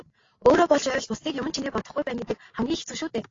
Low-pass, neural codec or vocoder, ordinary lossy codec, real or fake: 7.2 kHz; none; AAC, 32 kbps; real